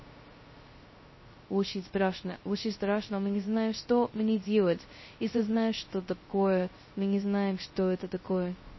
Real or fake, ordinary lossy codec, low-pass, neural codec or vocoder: fake; MP3, 24 kbps; 7.2 kHz; codec, 16 kHz, 0.2 kbps, FocalCodec